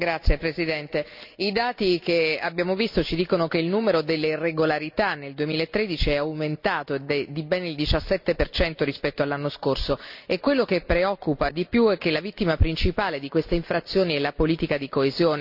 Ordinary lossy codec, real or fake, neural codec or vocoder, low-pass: none; real; none; 5.4 kHz